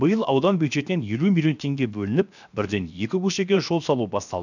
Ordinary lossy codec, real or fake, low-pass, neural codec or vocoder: none; fake; 7.2 kHz; codec, 16 kHz, 0.7 kbps, FocalCodec